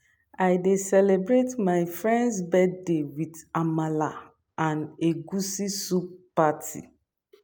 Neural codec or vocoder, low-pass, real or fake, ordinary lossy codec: none; none; real; none